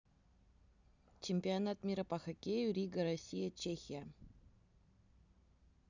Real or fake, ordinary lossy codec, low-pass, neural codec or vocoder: real; none; 7.2 kHz; none